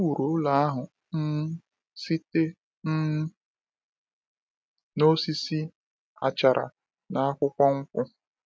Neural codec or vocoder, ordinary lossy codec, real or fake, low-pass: none; none; real; none